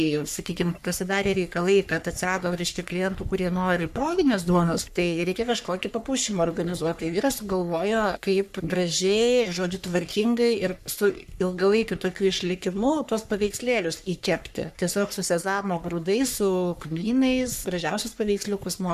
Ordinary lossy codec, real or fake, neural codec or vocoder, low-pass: MP3, 96 kbps; fake; codec, 44.1 kHz, 3.4 kbps, Pupu-Codec; 14.4 kHz